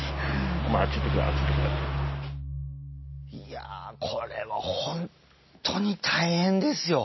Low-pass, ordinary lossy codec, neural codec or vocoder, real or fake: 7.2 kHz; MP3, 24 kbps; none; real